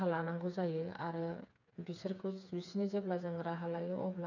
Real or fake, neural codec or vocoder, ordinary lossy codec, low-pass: fake; codec, 16 kHz, 4 kbps, FreqCodec, smaller model; none; 7.2 kHz